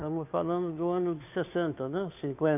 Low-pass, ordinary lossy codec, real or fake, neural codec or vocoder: 3.6 kHz; AAC, 32 kbps; fake; codec, 16 kHz, 2 kbps, FunCodec, trained on Chinese and English, 25 frames a second